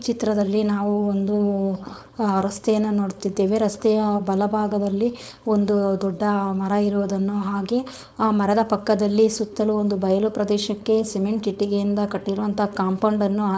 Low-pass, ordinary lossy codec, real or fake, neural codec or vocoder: none; none; fake; codec, 16 kHz, 4.8 kbps, FACodec